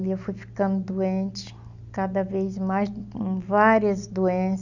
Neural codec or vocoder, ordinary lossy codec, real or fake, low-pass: none; none; real; 7.2 kHz